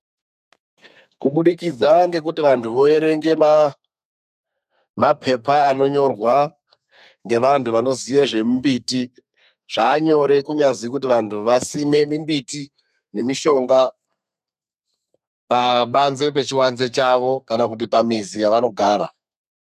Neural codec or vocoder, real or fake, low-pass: codec, 32 kHz, 1.9 kbps, SNAC; fake; 14.4 kHz